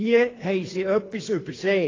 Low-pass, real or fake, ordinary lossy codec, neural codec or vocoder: 7.2 kHz; fake; AAC, 32 kbps; codec, 24 kHz, 3 kbps, HILCodec